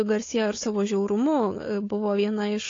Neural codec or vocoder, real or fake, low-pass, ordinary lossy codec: codec, 16 kHz, 4 kbps, FunCodec, trained on Chinese and English, 50 frames a second; fake; 7.2 kHz; AAC, 32 kbps